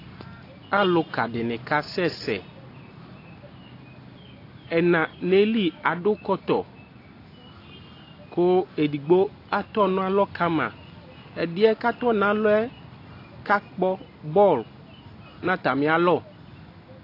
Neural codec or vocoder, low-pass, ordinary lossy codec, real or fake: none; 5.4 kHz; AAC, 32 kbps; real